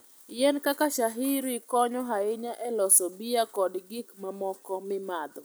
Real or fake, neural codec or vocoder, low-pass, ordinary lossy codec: real; none; none; none